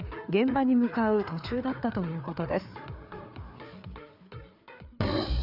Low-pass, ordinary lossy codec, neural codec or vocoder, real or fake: 5.4 kHz; none; codec, 16 kHz, 4 kbps, FreqCodec, larger model; fake